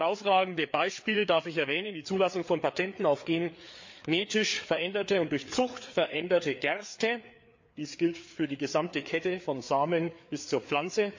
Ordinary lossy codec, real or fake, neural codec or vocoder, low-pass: MP3, 48 kbps; fake; codec, 16 kHz, 4 kbps, FreqCodec, larger model; 7.2 kHz